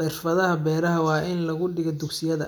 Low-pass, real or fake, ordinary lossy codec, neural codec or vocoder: none; real; none; none